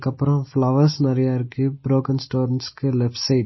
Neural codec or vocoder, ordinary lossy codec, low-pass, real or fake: none; MP3, 24 kbps; 7.2 kHz; real